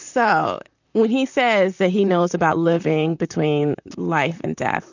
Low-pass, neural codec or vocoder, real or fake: 7.2 kHz; vocoder, 44.1 kHz, 128 mel bands, Pupu-Vocoder; fake